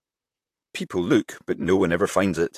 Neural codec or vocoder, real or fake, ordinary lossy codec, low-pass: vocoder, 44.1 kHz, 128 mel bands, Pupu-Vocoder; fake; AAC, 64 kbps; 14.4 kHz